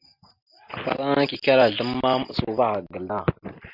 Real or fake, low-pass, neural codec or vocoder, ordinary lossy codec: real; 5.4 kHz; none; Opus, 64 kbps